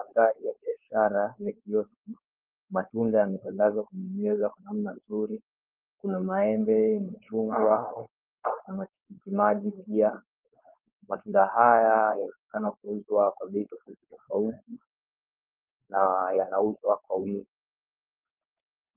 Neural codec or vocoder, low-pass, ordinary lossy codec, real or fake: codec, 16 kHz, 4.8 kbps, FACodec; 3.6 kHz; Opus, 24 kbps; fake